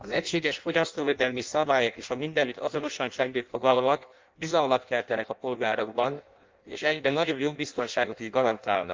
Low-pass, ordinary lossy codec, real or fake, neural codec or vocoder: 7.2 kHz; Opus, 32 kbps; fake; codec, 16 kHz in and 24 kHz out, 0.6 kbps, FireRedTTS-2 codec